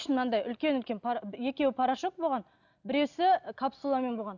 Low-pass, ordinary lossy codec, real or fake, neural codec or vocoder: 7.2 kHz; none; real; none